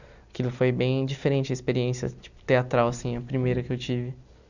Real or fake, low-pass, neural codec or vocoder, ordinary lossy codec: real; 7.2 kHz; none; none